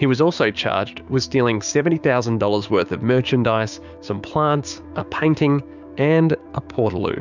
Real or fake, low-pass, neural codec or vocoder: fake; 7.2 kHz; codec, 16 kHz, 6 kbps, DAC